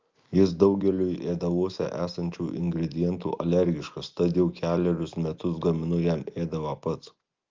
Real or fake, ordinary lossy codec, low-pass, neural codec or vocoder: real; Opus, 24 kbps; 7.2 kHz; none